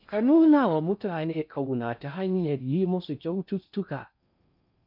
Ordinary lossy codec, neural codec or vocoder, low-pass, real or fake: none; codec, 16 kHz in and 24 kHz out, 0.6 kbps, FocalCodec, streaming, 4096 codes; 5.4 kHz; fake